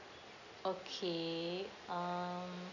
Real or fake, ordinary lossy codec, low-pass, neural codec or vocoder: real; none; 7.2 kHz; none